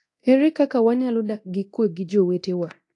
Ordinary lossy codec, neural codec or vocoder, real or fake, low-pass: AAC, 48 kbps; codec, 24 kHz, 0.9 kbps, DualCodec; fake; 10.8 kHz